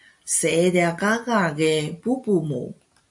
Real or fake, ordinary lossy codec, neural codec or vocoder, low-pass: real; AAC, 64 kbps; none; 10.8 kHz